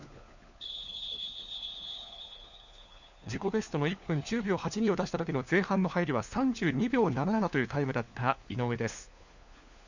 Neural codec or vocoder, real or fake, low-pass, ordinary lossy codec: codec, 16 kHz, 4 kbps, FunCodec, trained on LibriTTS, 50 frames a second; fake; 7.2 kHz; none